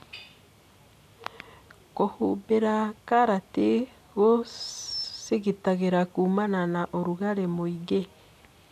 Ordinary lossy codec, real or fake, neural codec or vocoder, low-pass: none; real; none; 14.4 kHz